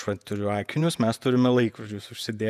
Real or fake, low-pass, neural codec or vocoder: fake; 14.4 kHz; vocoder, 48 kHz, 128 mel bands, Vocos